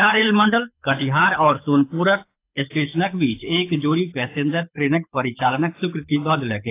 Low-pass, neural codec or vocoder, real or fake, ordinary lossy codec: 3.6 kHz; codec, 24 kHz, 6 kbps, HILCodec; fake; AAC, 24 kbps